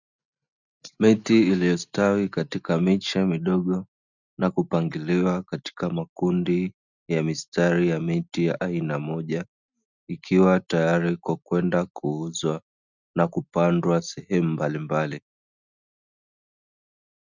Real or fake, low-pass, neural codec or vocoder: real; 7.2 kHz; none